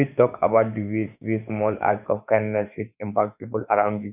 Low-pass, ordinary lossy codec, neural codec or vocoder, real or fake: 3.6 kHz; none; autoencoder, 48 kHz, 32 numbers a frame, DAC-VAE, trained on Japanese speech; fake